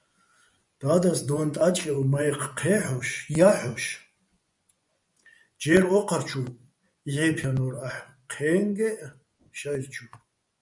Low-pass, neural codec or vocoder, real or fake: 10.8 kHz; vocoder, 24 kHz, 100 mel bands, Vocos; fake